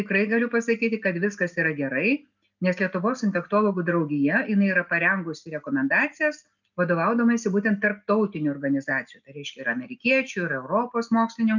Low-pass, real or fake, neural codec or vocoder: 7.2 kHz; real; none